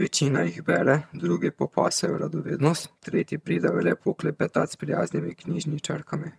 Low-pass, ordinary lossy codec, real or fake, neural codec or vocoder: none; none; fake; vocoder, 22.05 kHz, 80 mel bands, HiFi-GAN